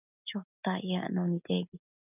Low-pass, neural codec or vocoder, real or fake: 3.6 kHz; none; real